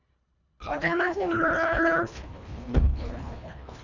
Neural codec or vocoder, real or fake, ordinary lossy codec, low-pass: codec, 24 kHz, 1.5 kbps, HILCodec; fake; none; 7.2 kHz